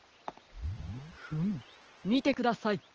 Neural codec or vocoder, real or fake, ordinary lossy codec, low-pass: none; real; Opus, 16 kbps; 7.2 kHz